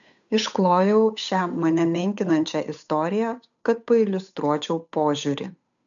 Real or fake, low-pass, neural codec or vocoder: fake; 7.2 kHz; codec, 16 kHz, 8 kbps, FunCodec, trained on Chinese and English, 25 frames a second